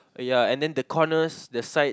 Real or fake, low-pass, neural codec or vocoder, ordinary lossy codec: real; none; none; none